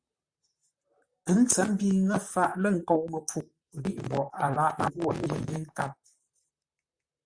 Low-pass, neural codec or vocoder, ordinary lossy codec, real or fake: 9.9 kHz; vocoder, 44.1 kHz, 128 mel bands, Pupu-Vocoder; Opus, 64 kbps; fake